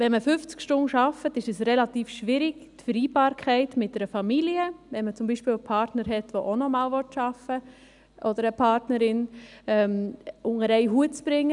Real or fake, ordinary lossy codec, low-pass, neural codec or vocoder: real; none; 10.8 kHz; none